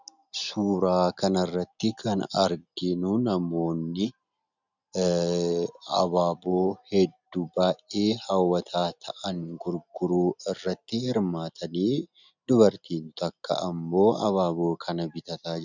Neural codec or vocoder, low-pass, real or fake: none; 7.2 kHz; real